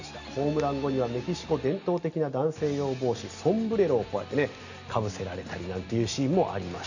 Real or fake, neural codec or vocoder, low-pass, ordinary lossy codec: real; none; 7.2 kHz; none